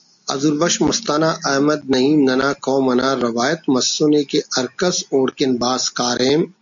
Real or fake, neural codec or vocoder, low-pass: real; none; 7.2 kHz